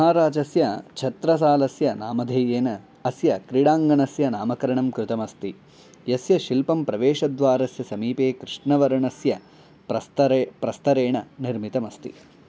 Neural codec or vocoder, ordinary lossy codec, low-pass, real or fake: none; none; none; real